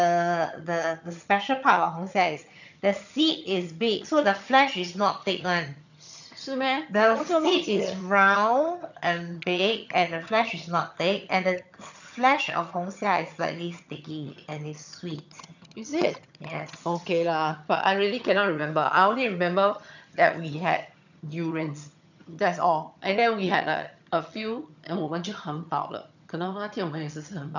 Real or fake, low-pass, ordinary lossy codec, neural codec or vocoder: fake; 7.2 kHz; none; vocoder, 22.05 kHz, 80 mel bands, HiFi-GAN